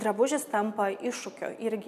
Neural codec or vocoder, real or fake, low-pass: none; real; 14.4 kHz